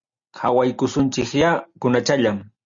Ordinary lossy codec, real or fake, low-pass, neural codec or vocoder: Opus, 64 kbps; real; 7.2 kHz; none